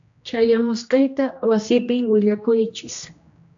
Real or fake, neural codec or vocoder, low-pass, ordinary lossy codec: fake; codec, 16 kHz, 1 kbps, X-Codec, HuBERT features, trained on general audio; 7.2 kHz; AAC, 64 kbps